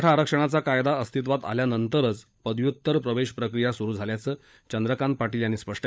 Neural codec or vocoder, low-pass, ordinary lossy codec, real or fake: codec, 16 kHz, 16 kbps, FunCodec, trained on LibriTTS, 50 frames a second; none; none; fake